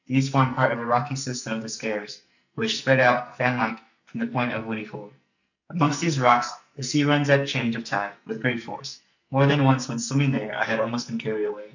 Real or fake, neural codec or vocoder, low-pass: fake; codec, 44.1 kHz, 2.6 kbps, SNAC; 7.2 kHz